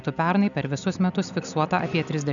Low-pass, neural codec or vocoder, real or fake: 7.2 kHz; none; real